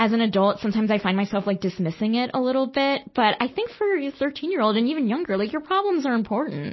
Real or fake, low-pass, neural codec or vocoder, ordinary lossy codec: real; 7.2 kHz; none; MP3, 24 kbps